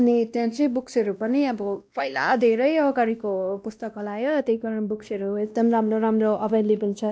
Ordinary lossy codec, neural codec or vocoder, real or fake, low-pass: none; codec, 16 kHz, 1 kbps, X-Codec, WavLM features, trained on Multilingual LibriSpeech; fake; none